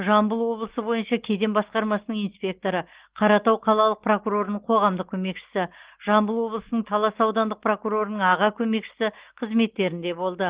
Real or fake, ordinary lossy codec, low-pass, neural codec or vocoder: real; Opus, 16 kbps; 3.6 kHz; none